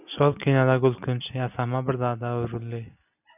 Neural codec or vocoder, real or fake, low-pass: none; real; 3.6 kHz